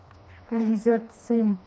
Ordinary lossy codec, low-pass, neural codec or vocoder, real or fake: none; none; codec, 16 kHz, 2 kbps, FreqCodec, smaller model; fake